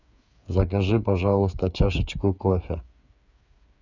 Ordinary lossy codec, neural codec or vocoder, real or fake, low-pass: none; codec, 16 kHz, 8 kbps, FreqCodec, smaller model; fake; 7.2 kHz